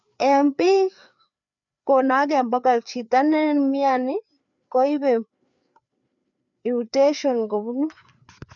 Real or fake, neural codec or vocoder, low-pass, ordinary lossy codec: fake; codec, 16 kHz, 4 kbps, FreqCodec, larger model; 7.2 kHz; none